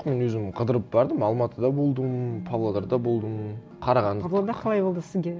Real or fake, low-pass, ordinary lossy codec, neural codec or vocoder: real; none; none; none